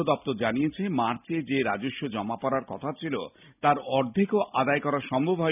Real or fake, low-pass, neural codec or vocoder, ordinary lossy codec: real; 3.6 kHz; none; none